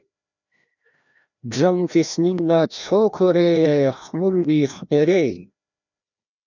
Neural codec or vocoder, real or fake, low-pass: codec, 16 kHz, 1 kbps, FreqCodec, larger model; fake; 7.2 kHz